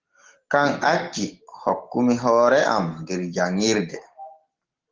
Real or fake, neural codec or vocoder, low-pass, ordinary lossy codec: real; none; 7.2 kHz; Opus, 16 kbps